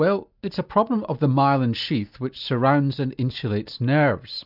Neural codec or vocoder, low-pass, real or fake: none; 5.4 kHz; real